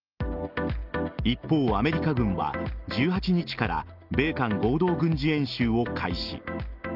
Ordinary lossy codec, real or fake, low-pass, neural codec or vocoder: Opus, 24 kbps; real; 5.4 kHz; none